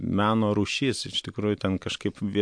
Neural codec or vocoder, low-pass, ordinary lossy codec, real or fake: none; 9.9 kHz; MP3, 64 kbps; real